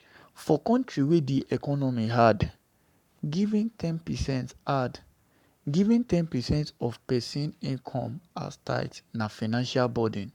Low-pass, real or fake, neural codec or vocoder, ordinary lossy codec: 19.8 kHz; fake; codec, 44.1 kHz, 7.8 kbps, Pupu-Codec; none